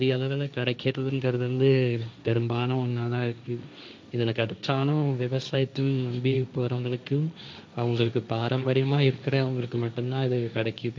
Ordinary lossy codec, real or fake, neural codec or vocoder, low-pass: none; fake; codec, 16 kHz, 1.1 kbps, Voila-Tokenizer; none